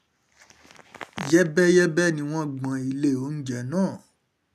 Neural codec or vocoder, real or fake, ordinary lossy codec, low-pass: none; real; none; 14.4 kHz